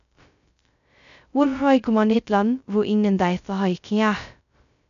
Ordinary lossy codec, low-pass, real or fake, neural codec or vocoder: none; 7.2 kHz; fake; codec, 16 kHz, 0.2 kbps, FocalCodec